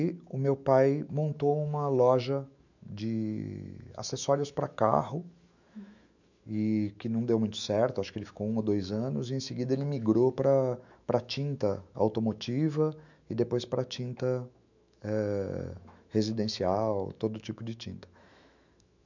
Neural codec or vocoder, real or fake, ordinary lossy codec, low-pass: autoencoder, 48 kHz, 128 numbers a frame, DAC-VAE, trained on Japanese speech; fake; none; 7.2 kHz